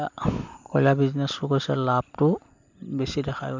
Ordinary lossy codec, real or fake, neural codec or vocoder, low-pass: MP3, 64 kbps; real; none; 7.2 kHz